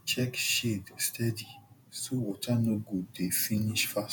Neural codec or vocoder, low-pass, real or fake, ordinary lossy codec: vocoder, 48 kHz, 128 mel bands, Vocos; none; fake; none